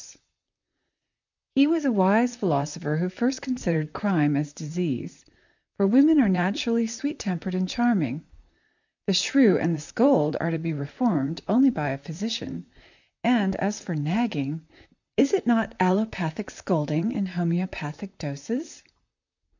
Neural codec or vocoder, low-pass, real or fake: vocoder, 44.1 kHz, 128 mel bands, Pupu-Vocoder; 7.2 kHz; fake